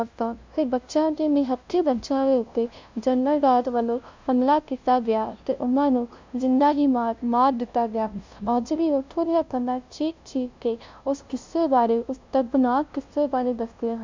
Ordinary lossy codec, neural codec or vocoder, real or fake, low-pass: MP3, 64 kbps; codec, 16 kHz, 0.5 kbps, FunCodec, trained on LibriTTS, 25 frames a second; fake; 7.2 kHz